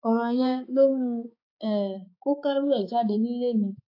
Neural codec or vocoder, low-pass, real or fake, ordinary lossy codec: codec, 16 kHz, 4 kbps, X-Codec, HuBERT features, trained on balanced general audio; 5.4 kHz; fake; AAC, 32 kbps